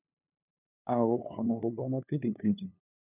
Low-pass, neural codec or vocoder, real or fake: 3.6 kHz; codec, 16 kHz, 2 kbps, FunCodec, trained on LibriTTS, 25 frames a second; fake